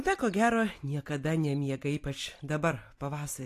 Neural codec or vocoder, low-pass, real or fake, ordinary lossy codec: vocoder, 44.1 kHz, 128 mel bands every 256 samples, BigVGAN v2; 14.4 kHz; fake; AAC, 64 kbps